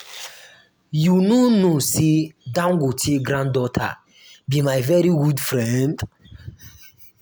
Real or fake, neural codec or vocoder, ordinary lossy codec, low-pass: real; none; none; none